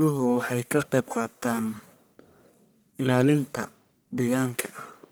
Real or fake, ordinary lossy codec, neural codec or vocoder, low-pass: fake; none; codec, 44.1 kHz, 1.7 kbps, Pupu-Codec; none